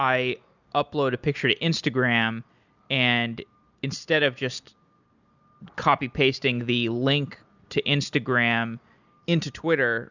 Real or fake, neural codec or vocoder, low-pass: real; none; 7.2 kHz